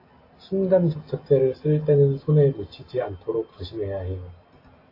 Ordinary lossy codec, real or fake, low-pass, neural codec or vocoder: AAC, 24 kbps; real; 5.4 kHz; none